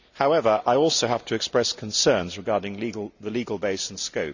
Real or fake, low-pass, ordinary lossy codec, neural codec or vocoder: real; 7.2 kHz; none; none